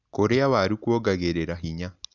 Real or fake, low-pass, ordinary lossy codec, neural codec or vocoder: real; 7.2 kHz; none; none